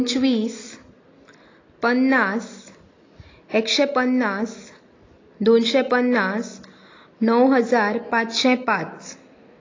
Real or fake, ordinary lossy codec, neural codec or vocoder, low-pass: real; AAC, 32 kbps; none; 7.2 kHz